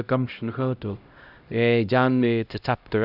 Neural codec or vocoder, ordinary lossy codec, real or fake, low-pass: codec, 16 kHz, 0.5 kbps, X-Codec, HuBERT features, trained on LibriSpeech; none; fake; 5.4 kHz